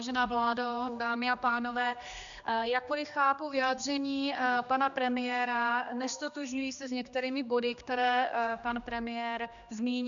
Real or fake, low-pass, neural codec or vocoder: fake; 7.2 kHz; codec, 16 kHz, 2 kbps, X-Codec, HuBERT features, trained on general audio